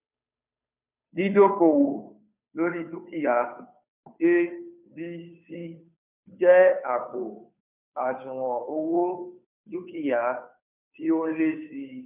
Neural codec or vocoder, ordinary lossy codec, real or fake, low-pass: codec, 16 kHz, 2 kbps, FunCodec, trained on Chinese and English, 25 frames a second; none; fake; 3.6 kHz